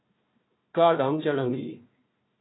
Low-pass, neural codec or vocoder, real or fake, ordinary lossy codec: 7.2 kHz; codec, 16 kHz, 1 kbps, FunCodec, trained on Chinese and English, 50 frames a second; fake; AAC, 16 kbps